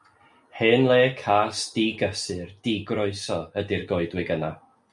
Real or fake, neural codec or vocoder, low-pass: real; none; 10.8 kHz